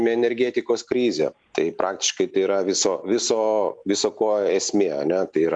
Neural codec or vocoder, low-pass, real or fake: none; 9.9 kHz; real